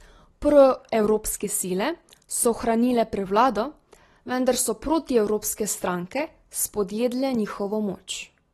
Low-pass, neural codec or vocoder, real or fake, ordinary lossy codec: 19.8 kHz; none; real; AAC, 32 kbps